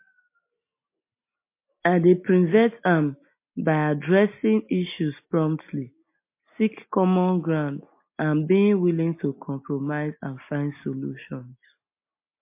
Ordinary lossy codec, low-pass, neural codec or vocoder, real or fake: MP3, 24 kbps; 3.6 kHz; none; real